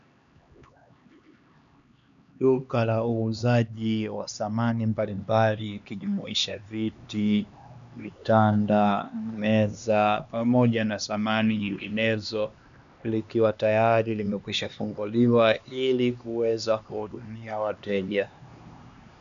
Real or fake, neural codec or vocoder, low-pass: fake; codec, 16 kHz, 2 kbps, X-Codec, HuBERT features, trained on LibriSpeech; 7.2 kHz